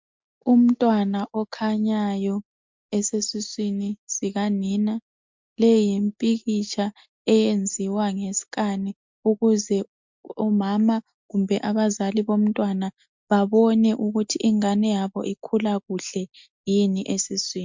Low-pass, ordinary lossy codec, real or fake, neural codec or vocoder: 7.2 kHz; MP3, 64 kbps; real; none